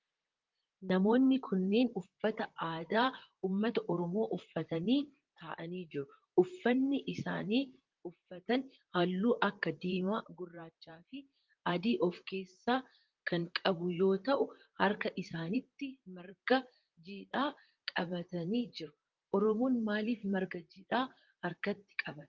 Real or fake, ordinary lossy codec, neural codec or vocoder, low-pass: fake; Opus, 32 kbps; vocoder, 44.1 kHz, 128 mel bands, Pupu-Vocoder; 7.2 kHz